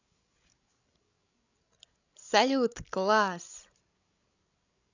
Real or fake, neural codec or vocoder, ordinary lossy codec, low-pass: fake; codec, 16 kHz, 16 kbps, FreqCodec, larger model; none; 7.2 kHz